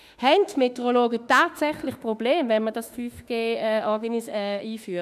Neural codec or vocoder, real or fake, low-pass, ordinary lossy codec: autoencoder, 48 kHz, 32 numbers a frame, DAC-VAE, trained on Japanese speech; fake; 14.4 kHz; none